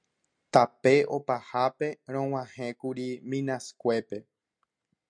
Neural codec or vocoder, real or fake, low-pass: none; real; 9.9 kHz